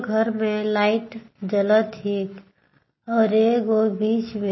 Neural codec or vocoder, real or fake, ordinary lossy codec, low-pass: none; real; MP3, 24 kbps; 7.2 kHz